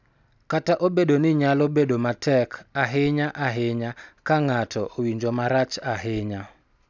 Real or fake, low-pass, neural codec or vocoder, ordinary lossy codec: real; 7.2 kHz; none; none